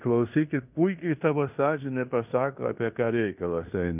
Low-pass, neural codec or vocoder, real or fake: 3.6 kHz; codec, 16 kHz in and 24 kHz out, 0.9 kbps, LongCat-Audio-Codec, fine tuned four codebook decoder; fake